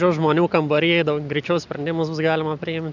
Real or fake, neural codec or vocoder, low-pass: real; none; 7.2 kHz